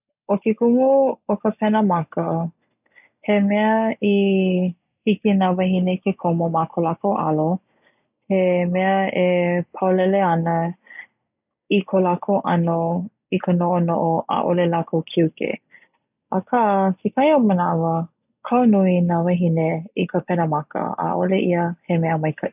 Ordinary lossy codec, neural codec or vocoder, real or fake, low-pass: none; none; real; 3.6 kHz